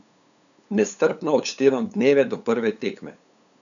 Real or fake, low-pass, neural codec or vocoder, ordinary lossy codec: fake; 7.2 kHz; codec, 16 kHz, 8 kbps, FunCodec, trained on LibriTTS, 25 frames a second; none